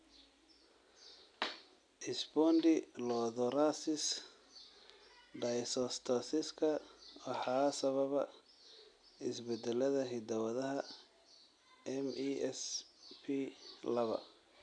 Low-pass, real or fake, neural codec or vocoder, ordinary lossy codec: 9.9 kHz; real; none; none